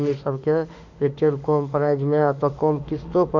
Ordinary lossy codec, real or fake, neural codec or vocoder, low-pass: none; fake; autoencoder, 48 kHz, 32 numbers a frame, DAC-VAE, trained on Japanese speech; 7.2 kHz